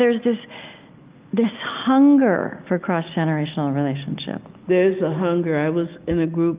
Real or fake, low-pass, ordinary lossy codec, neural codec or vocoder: real; 3.6 kHz; Opus, 24 kbps; none